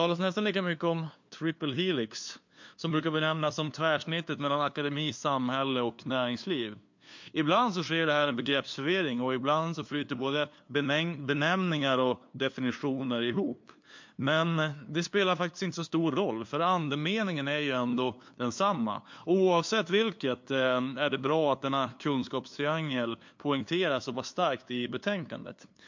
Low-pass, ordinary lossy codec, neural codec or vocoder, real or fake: 7.2 kHz; MP3, 48 kbps; codec, 16 kHz, 2 kbps, FunCodec, trained on LibriTTS, 25 frames a second; fake